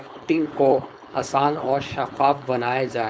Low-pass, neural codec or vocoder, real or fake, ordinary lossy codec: none; codec, 16 kHz, 4.8 kbps, FACodec; fake; none